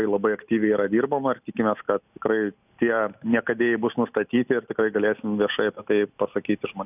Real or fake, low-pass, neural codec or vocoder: real; 3.6 kHz; none